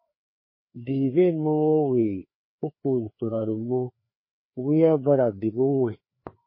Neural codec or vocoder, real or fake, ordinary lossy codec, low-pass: codec, 16 kHz, 2 kbps, FreqCodec, larger model; fake; MP3, 24 kbps; 5.4 kHz